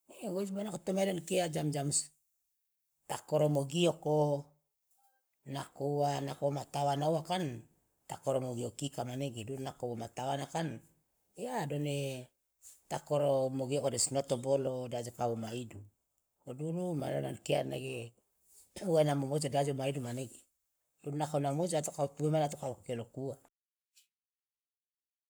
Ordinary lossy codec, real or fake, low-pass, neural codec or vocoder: none; fake; none; codec, 44.1 kHz, 7.8 kbps, Pupu-Codec